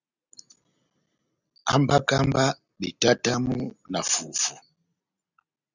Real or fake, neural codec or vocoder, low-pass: real; none; 7.2 kHz